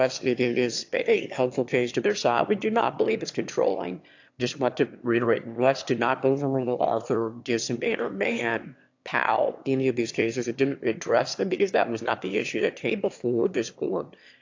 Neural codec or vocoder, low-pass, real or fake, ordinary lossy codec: autoencoder, 22.05 kHz, a latent of 192 numbers a frame, VITS, trained on one speaker; 7.2 kHz; fake; AAC, 48 kbps